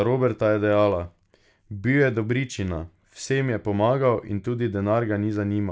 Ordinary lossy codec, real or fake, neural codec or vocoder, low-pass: none; real; none; none